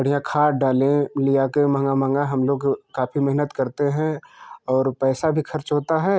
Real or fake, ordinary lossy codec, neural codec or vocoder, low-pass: real; none; none; none